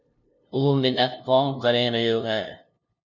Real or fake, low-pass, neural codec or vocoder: fake; 7.2 kHz; codec, 16 kHz, 0.5 kbps, FunCodec, trained on LibriTTS, 25 frames a second